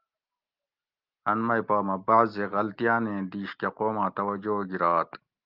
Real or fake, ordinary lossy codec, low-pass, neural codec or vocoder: real; Opus, 32 kbps; 5.4 kHz; none